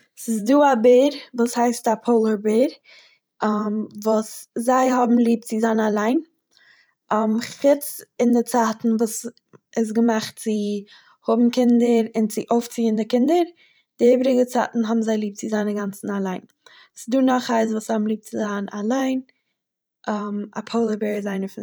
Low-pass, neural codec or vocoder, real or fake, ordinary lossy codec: none; vocoder, 44.1 kHz, 128 mel bands every 512 samples, BigVGAN v2; fake; none